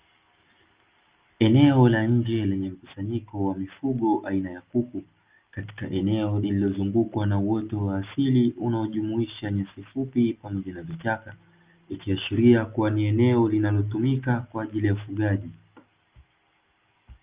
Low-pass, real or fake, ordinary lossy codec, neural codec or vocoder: 3.6 kHz; real; Opus, 24 kbps; none